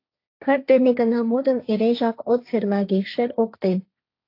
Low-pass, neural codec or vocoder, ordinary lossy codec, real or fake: 5.4 kHz; codec, 16 kHz, 1.1 kbps, Voila-Tokenizer; AAC, 32 kbps; fake